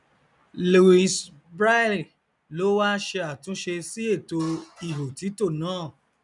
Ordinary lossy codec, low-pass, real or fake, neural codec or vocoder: none; 10.8 kHz; real; none